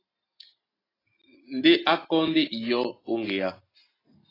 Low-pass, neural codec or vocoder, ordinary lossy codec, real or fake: 5.4 kHz; none; AAC, 24 kbps; real